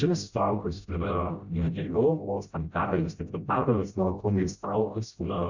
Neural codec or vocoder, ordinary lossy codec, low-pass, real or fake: codec, 16 kHz, 0.5 kbps, FreqCodec, smaller model; Opus, 64 kbps; 7.2 kHz; fake